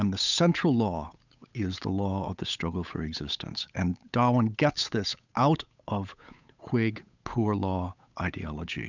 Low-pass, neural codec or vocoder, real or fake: 7.2 kHz; codec, 16 kHz, 8 kbps, FunCodec, trained on Chinese and English, 25 frames a second; fake